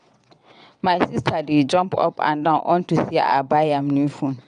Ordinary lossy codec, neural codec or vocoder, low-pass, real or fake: none; vocoder, 22.05 kHz, 80 mel bands, WaveNeXt; 9.9 kHz; fake